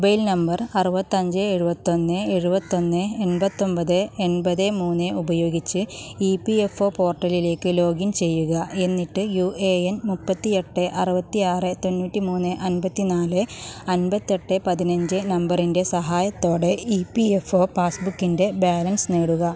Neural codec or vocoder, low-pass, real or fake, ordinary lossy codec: none; none; real; none